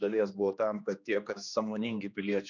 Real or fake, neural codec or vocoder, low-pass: fake; codec, 16 kHz, 2 kbps, X-Codec, HuBERT features, trained on general audio; 7.2 kHz